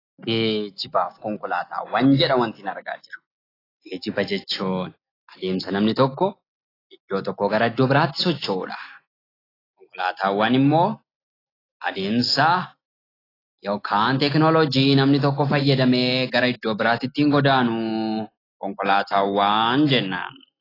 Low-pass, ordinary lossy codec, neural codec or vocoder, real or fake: 5.4 kHz; AAC, 24 kbps; none; real